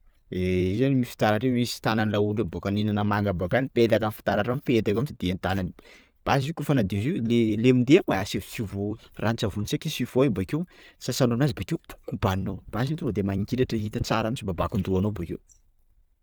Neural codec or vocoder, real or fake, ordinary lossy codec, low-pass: vocoder, 44.1 kHz, 128 mel bands every 256 samples, BigVGAN v2; fake; none; none